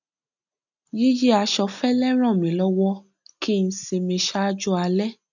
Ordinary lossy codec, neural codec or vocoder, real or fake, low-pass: none; none; real; 7.2 kHz